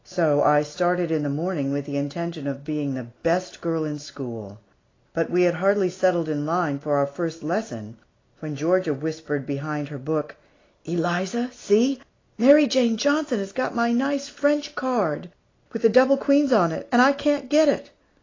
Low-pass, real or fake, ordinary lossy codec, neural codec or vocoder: 7.2 kHz; real; AAC, 32 kbps; none